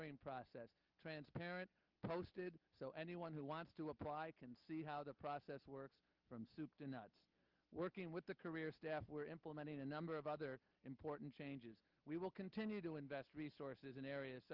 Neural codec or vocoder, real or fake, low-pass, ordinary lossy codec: none; real; 5.4 kHz; Opus, 24 kbps